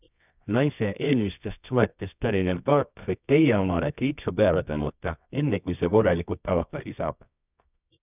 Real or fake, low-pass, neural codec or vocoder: fake; 3.6 kHz; codec, 24 kHz, 0.9 kbps, WavTokenizer, medium music audio release